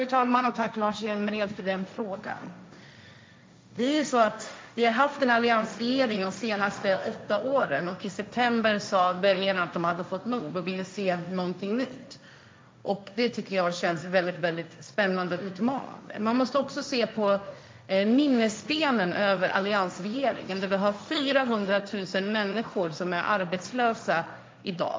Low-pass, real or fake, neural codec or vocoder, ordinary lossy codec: none; fake; codec, 16 kHz, 1.1 kbps, Voila-Tokenizer; none